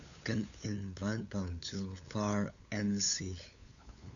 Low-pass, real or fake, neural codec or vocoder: 7.2 kHz; fake; codec, 16 kHz, 8 kbps, FunCodec, trained on LibriTTS, 25 frames a second